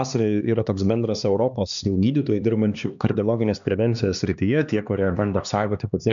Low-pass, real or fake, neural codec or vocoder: 7.2 kHz; fake; codec, 16 kHz, 2 kbps, X-Codec, HuBERT features, trained on LibriSpeech